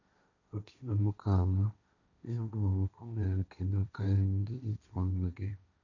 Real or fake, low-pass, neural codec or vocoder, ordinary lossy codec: fake; 7.2 kHz; codec, 16 kHz, 1.1 kbps, Voila-Tokenizer; none